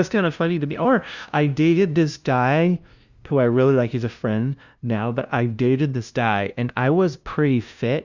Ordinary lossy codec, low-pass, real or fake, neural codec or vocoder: Opus, 64 kbps; 7.2 kHz; fake; codec, 16 kHz, 0.5 kbps, FunCodec, trained on LibriTTS, 25 frames a second